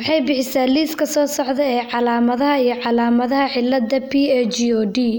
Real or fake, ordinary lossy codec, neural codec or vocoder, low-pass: real; none; none; none